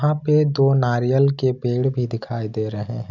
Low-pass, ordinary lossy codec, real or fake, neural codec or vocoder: 7.2 kHz; none; real; none